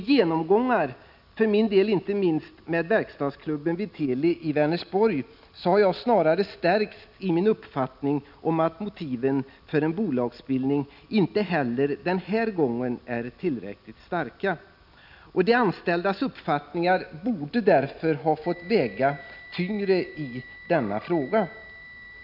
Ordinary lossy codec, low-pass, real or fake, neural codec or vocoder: none; 5.4 kHz; real; none